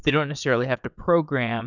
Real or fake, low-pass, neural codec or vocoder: fake; 7.2 kHz; vocoder, 22.05 kHz, 80 mel bands, WaveNeXt